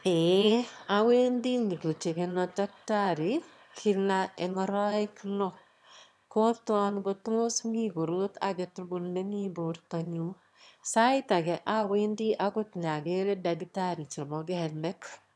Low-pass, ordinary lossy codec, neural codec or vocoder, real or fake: none; none; autoencoder, 22.05 kHz, a latent of 192 numbers a frame, VITS, trained on one speaker; fake